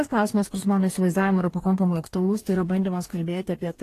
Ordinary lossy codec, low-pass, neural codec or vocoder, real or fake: AAC, 48 kbps; 14.4 kHz; codec, 44.1 kHz, 2.6 kbps, DAC; fake